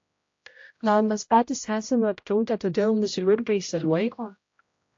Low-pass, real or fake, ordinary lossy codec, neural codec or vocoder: 7.2 kHz; fake; AAC, 48 kbps; codec, 16 kHz, 0.5 kbps, X-Codec, HuBERT features, trained on general audio